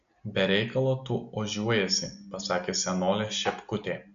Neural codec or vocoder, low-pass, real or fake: none; 7.2 kHz; real